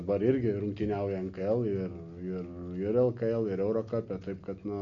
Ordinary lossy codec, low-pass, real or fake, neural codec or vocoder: MP3, 64 kbps; 7.2 kHz; real; none